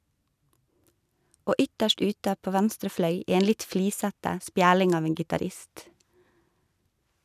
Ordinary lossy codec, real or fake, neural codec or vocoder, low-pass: none; real; none; 14.4 kHz